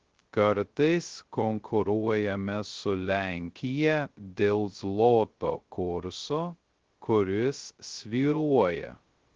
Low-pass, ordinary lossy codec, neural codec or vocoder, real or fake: 7.2 kHz; Opus, 16 kbps; codec, 16 kHz, 0.2 kbps, FocalCodec; fake